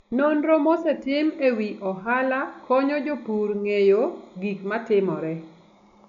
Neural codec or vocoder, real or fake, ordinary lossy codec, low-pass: none; real; none; 7.2 kHz